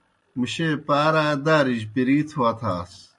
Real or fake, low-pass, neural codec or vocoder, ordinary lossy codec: real; 10.8 kHz; none; MP3, 48 kbps